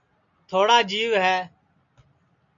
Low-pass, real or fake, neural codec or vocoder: 7.2 kHz; real; none